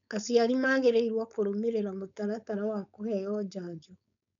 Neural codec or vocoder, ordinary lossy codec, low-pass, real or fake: codec, 16 kHz, 4.8 kbps, FACodec; none; 7.2 kHz; fake